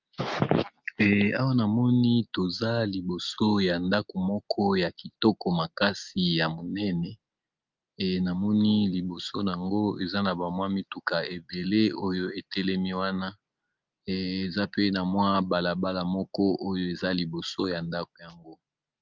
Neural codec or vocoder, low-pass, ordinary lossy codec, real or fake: none; 7.2 kHz; Opus, 24 kbps; real